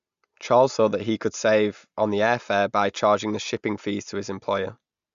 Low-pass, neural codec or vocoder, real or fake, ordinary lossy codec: 7.2 kHz; none; real; Opus, 64 kbps